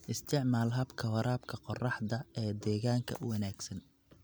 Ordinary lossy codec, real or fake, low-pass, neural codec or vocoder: none; real; none; none